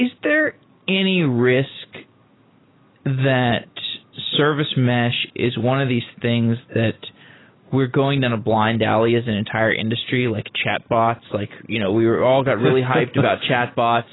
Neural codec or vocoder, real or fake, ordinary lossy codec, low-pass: none; real; AAC, 16 kbps; 7.2 kHz